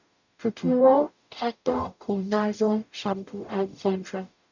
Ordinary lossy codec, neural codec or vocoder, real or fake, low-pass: none; codec, 44.1 kHz, 0.9 kbps, DAC; fake; 7.2 kHz